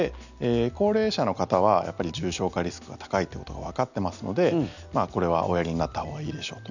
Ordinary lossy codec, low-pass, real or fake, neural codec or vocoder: none; 7.2 kHz; real; none